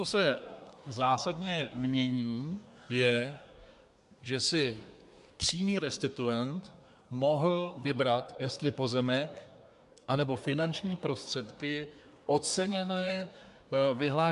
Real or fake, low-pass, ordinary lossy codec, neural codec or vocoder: fake; 10.8 kHz; AAC, 96 kbps; codec, 24 kHz, 1 kbps, SNAC